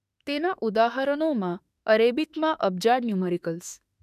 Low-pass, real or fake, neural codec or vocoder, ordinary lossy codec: 14.4 kHz; fake; autoencoder, 48 kHz, 32 numbers a frame, DAC-VAE, trained on Japanese speech; none